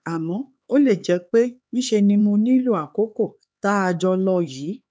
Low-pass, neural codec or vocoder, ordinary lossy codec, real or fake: none; codec, 16 kHz, 4 kbps, X-Codec, HuBERT features, trained on LibriSpeech; none; fake